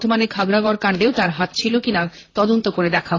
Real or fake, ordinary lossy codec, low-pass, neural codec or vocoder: fake; AAC, 32 kbps; 7.2 kHz; vocoder, 44.1 kHz, 128 mel bands, Pupu-Vocoder